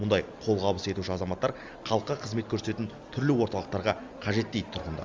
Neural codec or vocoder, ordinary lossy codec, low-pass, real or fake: none; Opus, 32 kbps; 7.2 kHz; real